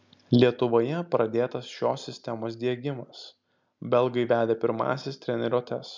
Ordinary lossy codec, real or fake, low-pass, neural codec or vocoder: MP3, 64 kbps; real; 7.2 kHz; none